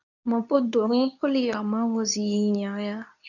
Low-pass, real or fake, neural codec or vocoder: 7.2 kHz; fake; codec, 24 kHz, 0.9 kbps, WavTokenizer, medium speech release version 2